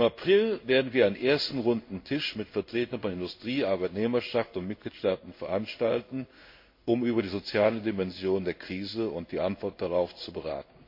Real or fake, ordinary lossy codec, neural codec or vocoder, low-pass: fake; MP3, 32 kbps; codec, 16 kHz in and 24 kHz out, 1 kbps, XY-Tokenizer; 5.4 kHz